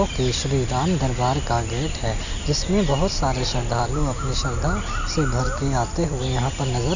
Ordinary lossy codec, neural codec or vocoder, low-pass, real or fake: none; none; 7.2 kHz; real